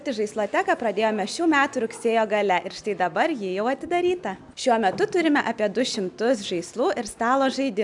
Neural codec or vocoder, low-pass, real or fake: none; 10.8 kHz; real